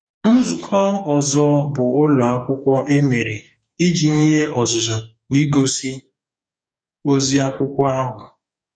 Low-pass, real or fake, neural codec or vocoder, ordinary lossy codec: 9.9 kHz; fake; codec, 44.1 kHz, 2.6 kbps, DAC; none